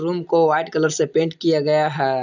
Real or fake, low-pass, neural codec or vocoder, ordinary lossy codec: real; 7.2 kHz; none; none